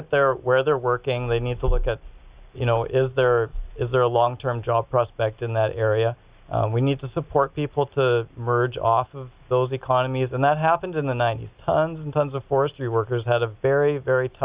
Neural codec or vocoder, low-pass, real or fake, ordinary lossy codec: none; 3.6 kHz; real; Opus, 64 kbps